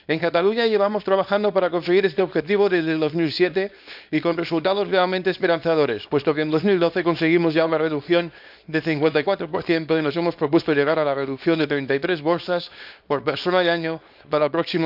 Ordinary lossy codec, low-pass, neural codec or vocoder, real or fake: none; 5.4 kHz; codec, 24 kHz, 0.9 kbps, WavTokenizer, small release; fake